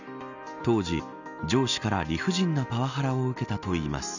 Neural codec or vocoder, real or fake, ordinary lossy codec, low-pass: none; real; none; 7.2 kHz